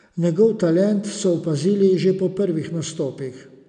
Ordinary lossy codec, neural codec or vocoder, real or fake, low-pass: none; none; real; 9.9 kHz